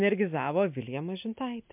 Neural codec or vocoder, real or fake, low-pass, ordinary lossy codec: none; real; 3.6 kHz; MP3, 32 kbps